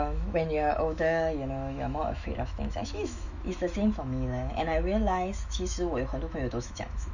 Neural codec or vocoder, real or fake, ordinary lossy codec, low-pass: autoencoder, 48 kHz, 128 numbers a frame, DAC-VAE, trained on Japanese speech; fake; none; 7.2 kHz